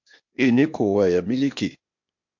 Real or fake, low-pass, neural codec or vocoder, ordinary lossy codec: fake; 7.2 kHz; codec, 16 kHz, 0.8 kbps, ZipCodec; MP3, 48 kbps